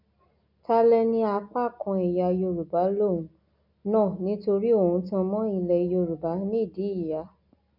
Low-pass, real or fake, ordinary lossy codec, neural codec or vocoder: 5.4 kHz; real; none; none